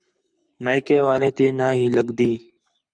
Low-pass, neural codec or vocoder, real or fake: 9.9 kHz; codec, 24 kHz, 6 kbps, HILCodec; fake